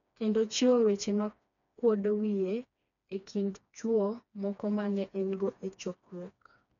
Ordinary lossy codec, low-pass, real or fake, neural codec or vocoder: none; 7.2 kHz; fake; codec, 16 kHz, 2 kbps, FreqCodec, smaller model